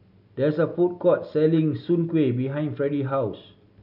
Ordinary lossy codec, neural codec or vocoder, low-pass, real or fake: none; none; 5.4 kHz; real